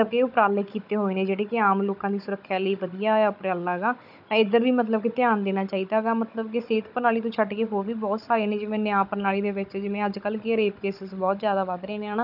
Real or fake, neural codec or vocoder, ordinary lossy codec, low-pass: fake; codec, 16 kHz, 16 kbps, FunCodec, trained on Chinese and English, 50 frames a second; none; 5.4 kHz